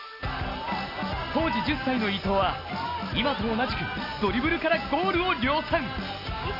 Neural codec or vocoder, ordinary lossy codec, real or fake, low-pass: none; none; real; 5.4 kHz